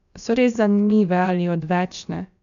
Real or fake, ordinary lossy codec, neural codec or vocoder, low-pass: fake; none; codec, 16 kHz, about 1 kbps, DyCAST, with the encoder's durations; 7.2 kHz